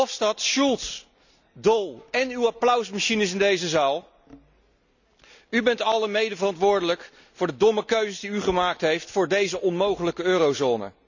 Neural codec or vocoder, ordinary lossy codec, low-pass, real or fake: none; none; 7.2 kHz; real